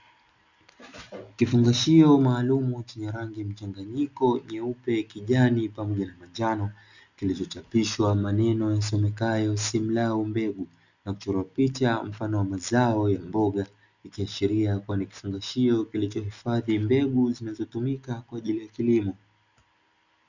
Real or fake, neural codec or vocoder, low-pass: real; none; 7.2 kHz